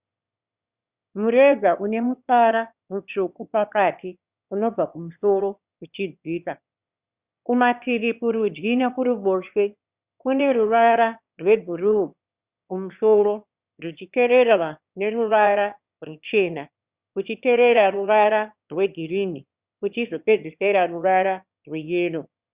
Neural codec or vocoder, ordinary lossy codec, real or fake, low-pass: autoencoder, 22.05 kHz, a latent of 192 numbers a frame, VITS, trained on one speaker; Opus, 64 kbps; fake; 3.6 kHz